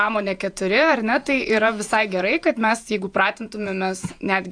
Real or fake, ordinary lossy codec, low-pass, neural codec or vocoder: fake; AAC, 64 kbps; 9.9 kHz; vocoder, 48 kHz, 128 mel bands, Vocos